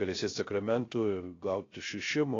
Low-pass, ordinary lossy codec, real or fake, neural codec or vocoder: 7.2 kHz; AAC, 32 kbps; fake; codec, 16 kHz, 0.3 kbps, FocalCodec